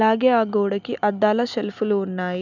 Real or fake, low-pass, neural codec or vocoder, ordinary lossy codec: real; 7.2 kHz; none; none